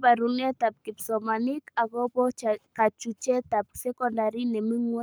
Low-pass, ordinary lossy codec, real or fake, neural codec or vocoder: none; none; fake; codec, 44.1 kHz, 7.8 kbps, Pupu-Codec